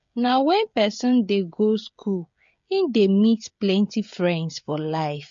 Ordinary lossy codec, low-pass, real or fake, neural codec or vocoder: MP3, 48 kbps; 7.2 kHz; fake; codec, 16 kHz, 16 kbps, FreqCodec, smaller model